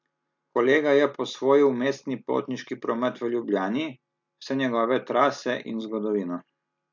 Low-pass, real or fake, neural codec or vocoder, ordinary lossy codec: 7.2 kHz; real; none; MP3, 64 kbps